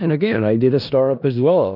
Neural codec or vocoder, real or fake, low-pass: codec, 16 kHz in and 24 kHz out, 0.4 kbps, LongCat-Audio-Codec, four codebook decoder; fake; 5.4 kHz